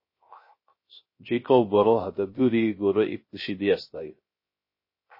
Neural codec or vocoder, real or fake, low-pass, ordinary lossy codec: codec, 16 kHz, 0.3 kbps, FocalCodec; fake; 5.4 kHz; MP3, 24 kbps